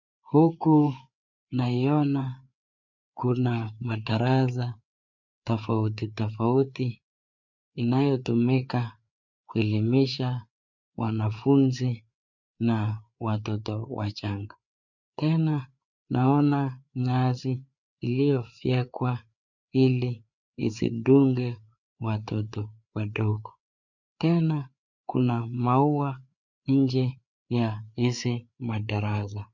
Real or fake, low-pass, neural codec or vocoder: fake; 7.2 kHz; codec, 16 kHz, 4 kbps, FreqCodec, larger model